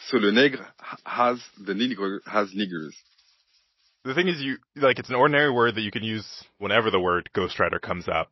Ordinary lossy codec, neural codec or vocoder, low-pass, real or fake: MP3, 24 kbps; none; 7.2 kHz; real